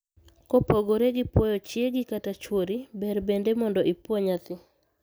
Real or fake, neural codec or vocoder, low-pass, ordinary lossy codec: real; none; none; none